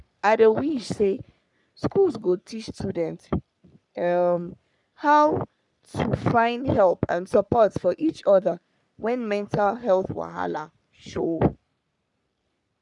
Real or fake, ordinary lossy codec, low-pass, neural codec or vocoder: fake; none; 10.8 kHz; codec, 44.1 kHz, 3.4 kbps, Pupu-Codec